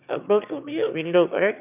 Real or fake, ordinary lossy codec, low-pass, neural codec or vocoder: fake; none; 3.6 kHz; autoencoder, 22.05 kHz, a latent of 192 numbers a frame, VITS, trained on one speaker